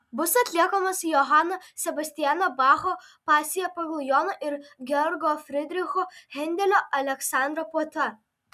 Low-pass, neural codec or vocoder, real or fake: 14.4 kHz; none; real